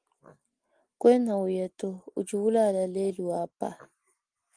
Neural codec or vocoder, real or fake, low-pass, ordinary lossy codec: none; real; 9.9 kHz; Opus, 24 kbps